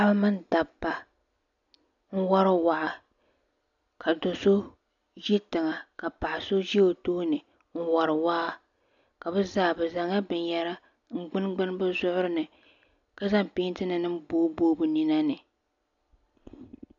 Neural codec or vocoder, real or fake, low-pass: none; real; 7.2 kHz